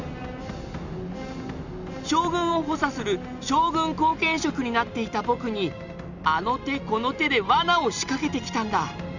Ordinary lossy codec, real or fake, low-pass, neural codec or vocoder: none; real; 7.2 kHz; none